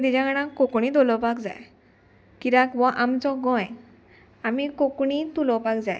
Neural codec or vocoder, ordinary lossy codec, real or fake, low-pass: none; none; real; none